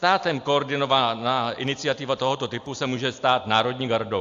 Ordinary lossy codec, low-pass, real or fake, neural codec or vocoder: AAC, 64 kbps; 7.2 kHz; real; none